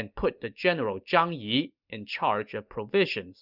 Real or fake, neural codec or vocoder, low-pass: real; none; 5.4 kHz